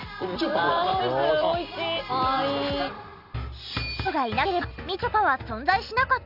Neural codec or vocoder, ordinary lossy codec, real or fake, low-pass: none; none; real; 5.4 kHz